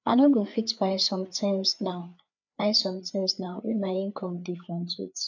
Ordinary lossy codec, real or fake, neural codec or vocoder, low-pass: none; fake; codec, 16 kHz, 4 kbps, FreqCodec, larger model; 7.2 kHz